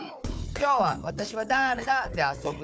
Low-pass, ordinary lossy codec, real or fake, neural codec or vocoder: none; none; fake; codec, 16 kHz, 4 kbps, FunCodec, trained on Chinese and English, 50 frames a second